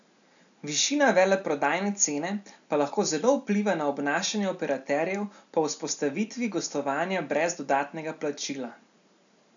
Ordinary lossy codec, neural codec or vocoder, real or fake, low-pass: none; none; real; 7.2 kHz